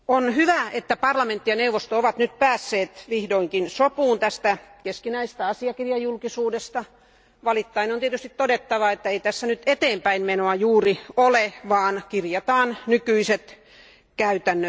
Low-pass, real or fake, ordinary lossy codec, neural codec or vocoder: none; real; none; none